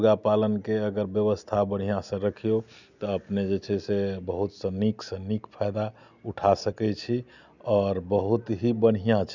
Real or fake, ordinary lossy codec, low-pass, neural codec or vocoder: real; none; 7.2 kHz; none